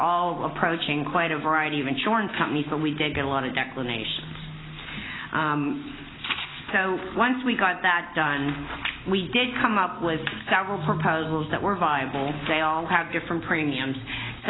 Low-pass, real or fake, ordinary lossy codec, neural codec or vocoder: 7.2 kHz; real; AAC, 16 kbps; none